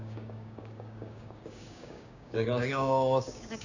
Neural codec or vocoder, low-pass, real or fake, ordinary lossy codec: codec, 16 kHz, 6 kbps, DAC; 7.2 kHz; fake; none